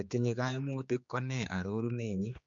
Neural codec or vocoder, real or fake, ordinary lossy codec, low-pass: codec, 16 kHz, 2 kbps, X-Codec, HuBERT features, trained on general audio; fake; MP3, 64 kbps; 7.2 kHz